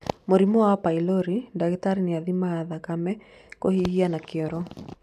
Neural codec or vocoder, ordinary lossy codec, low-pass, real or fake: none; none; 14.4 kHz; real